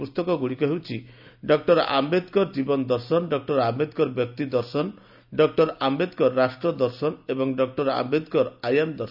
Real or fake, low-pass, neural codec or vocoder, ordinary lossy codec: real; 5.4 kHz; none; none